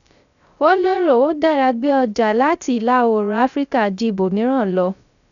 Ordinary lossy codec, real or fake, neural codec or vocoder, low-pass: none; fake; codec, 16 kHz, 0.3 kbps, FocalCodec; 7.2 kHz